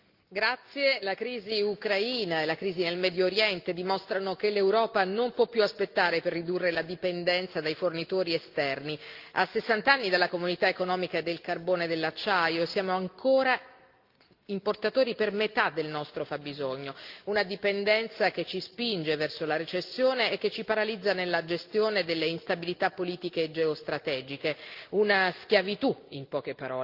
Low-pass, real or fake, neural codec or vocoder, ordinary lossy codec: 5.4 kHz; real; none; Opus, 24 kbps